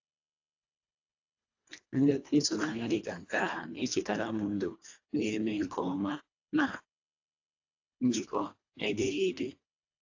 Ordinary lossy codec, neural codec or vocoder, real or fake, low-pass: AAC, 48 kbps; codec, 24 kHz, 1.5 kbps, HILCodec; fake; 7.2 kHz